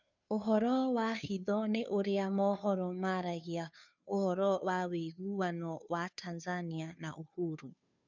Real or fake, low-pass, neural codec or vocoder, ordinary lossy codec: fake; 7.2 kHz; codec, 16 kHz, 2 kbps, FunCodec, trained on Chinese and English, 25 frames a second; none